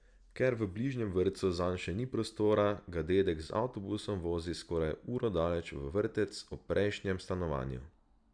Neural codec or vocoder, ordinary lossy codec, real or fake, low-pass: none; Opus, 64 kbps; real; 9.9 kHz